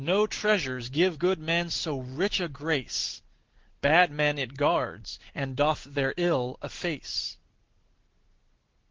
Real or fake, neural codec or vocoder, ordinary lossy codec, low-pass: real; none; Opus, 16 kbps; 7.2 kHz